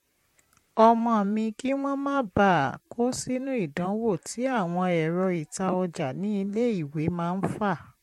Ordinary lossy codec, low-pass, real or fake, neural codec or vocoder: MP3, 64 kbps; 19.8 kHz; fake; vocoder, 44.1 kHz, 128 mel bands, Pupu-Vocoder